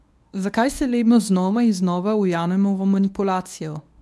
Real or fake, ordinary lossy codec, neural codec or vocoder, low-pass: fake; none; codec, 24 kHz, 0.9 kbps, WavTokenizer, medium speech release version 1; none